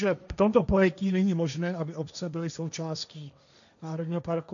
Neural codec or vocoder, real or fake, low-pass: codec, 16 kHz, 1.1 kbps, Voila-Tokenizer; fake; 7.2 kHz